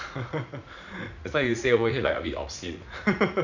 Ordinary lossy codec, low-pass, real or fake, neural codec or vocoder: none; 7.2 kHz; fake; vocoder, 44.1 kHz, 80 mel bands, Vocos